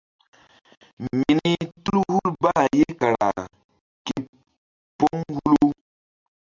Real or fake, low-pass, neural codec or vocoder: real; 7.2 kHz; none